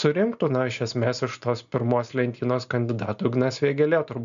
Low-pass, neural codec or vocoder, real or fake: 7.2 kHz; none; real